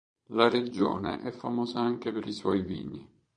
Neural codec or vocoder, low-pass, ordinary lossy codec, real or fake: vocoder, 22.05 kHz, 80 mel bands, Vocos; 9.9 kHz; MP3, 48 kbps; fake